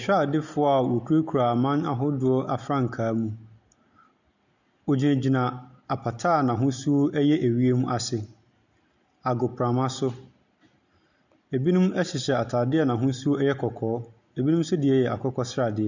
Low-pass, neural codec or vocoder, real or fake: 7.2 kHz; none; real